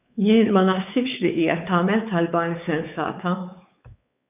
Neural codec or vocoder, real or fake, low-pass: codec, 16 kHz, 4 kbps, X-Codec, WavLM features, trained on Multilingual LibriSpeech; fake; 3.6 kHz